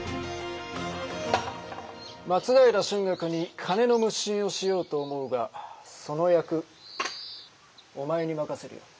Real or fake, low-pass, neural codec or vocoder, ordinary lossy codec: real; none; none; none